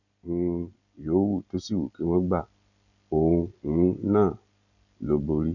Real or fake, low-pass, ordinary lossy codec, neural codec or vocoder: real; 7.2 kHz; none; none